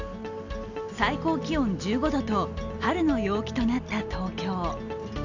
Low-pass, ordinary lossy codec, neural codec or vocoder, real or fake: 7.2 kHz; none; none; real